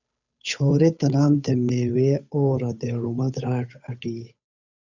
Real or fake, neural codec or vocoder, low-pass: fake; codec, 16 kHz, 8 kbps, FunCodec, trained on Chinese and English, 25 frames a second; 7.2 kHz